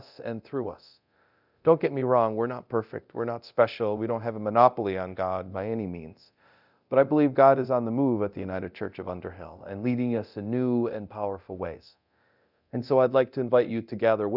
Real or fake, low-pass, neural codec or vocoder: fake; 5.4 kHz; codec, 24 kHz, 0.9 kbps, DualCodec